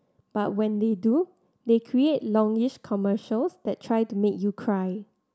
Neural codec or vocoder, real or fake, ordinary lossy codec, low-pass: none; real; none; none